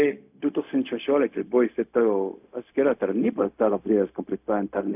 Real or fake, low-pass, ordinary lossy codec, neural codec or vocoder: fake; 3.6 kHz; AAC, 32 kbps; codec, 16 kHz, 0.4 kbps, LongCat-Audio-Codec